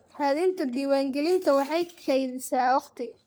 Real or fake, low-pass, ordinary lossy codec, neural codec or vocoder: fake; none; none; codec, 44.1 kHz, 3.4 kbps, Pupu-Codec